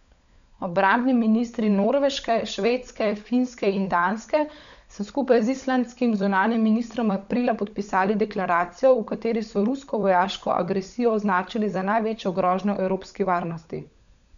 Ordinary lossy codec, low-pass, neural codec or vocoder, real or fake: MP3, 96 kbps; 7.2 kHz; codec, 16 kHz, 16 kbps, FunCodec, trained on LibriTTS, 50 frames a second; fake